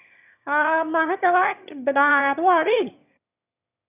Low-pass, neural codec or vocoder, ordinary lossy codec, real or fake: 3.6 kHz; autoencoder, 22.05 kHz, a latent of 192 numbers a frame, VITS, trained on one speaker; none; fake